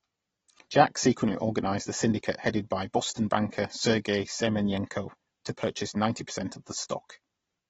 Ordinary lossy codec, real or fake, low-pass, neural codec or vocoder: AAC, 24 kbps; real; 10.8 kHz; none